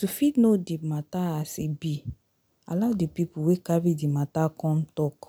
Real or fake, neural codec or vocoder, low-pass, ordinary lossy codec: real; none; none; none